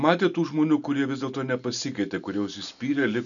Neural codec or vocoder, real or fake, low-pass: none; real; 7.2 kHz